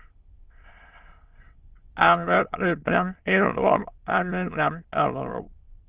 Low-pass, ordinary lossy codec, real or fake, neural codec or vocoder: 3.6 kHz; Opus, 16 kbps; fake; autoencoder, 22.05 kHz, a latent of 192 numbers a frame, VITS, trained on many speakers